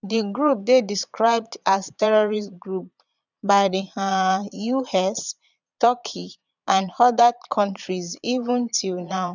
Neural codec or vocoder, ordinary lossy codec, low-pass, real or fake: vocoder, 22.05 kHz, 80 mel bands, Vocos; none; 7.2 kHz; fake